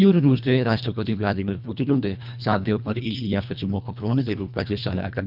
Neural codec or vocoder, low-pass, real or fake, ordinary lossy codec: codec, 24 kHz, 1.5 kbps, HILCodec; 5.4 kHz; fake; none